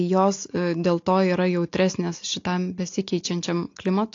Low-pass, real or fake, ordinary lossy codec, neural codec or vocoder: 7.2 kHz; real; AAC, 48 kbps; none